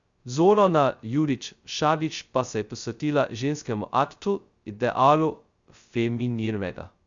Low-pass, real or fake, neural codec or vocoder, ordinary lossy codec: 7.2 kHz; fake; codec, 16 kHz, 0.2 kbps, FocalCodec; Opus, 64 kbps